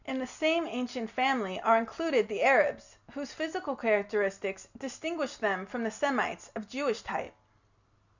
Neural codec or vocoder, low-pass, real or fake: none; 7.2 kHz; real